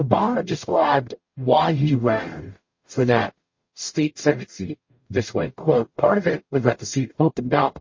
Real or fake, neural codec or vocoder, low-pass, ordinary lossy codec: fake; codec, 44.1 kHz, 0.9 kbps, DAC; 7.2 kHz; MP3, 32 kbps